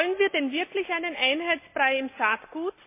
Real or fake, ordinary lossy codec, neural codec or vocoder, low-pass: real; MP3, 24 kbps; none; 3.6 kHz